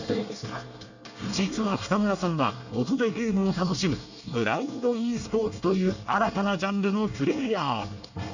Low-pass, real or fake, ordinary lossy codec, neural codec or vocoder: 7.2 kHz; fake; none; codec, 24 kHz, 1 kbps, SNAC